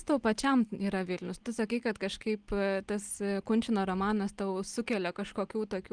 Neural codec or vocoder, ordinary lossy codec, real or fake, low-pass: none; Opus, 24 kbps; real; 9.9 kHz